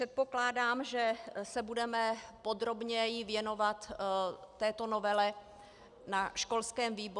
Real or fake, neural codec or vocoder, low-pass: real; none; 10.8 kHz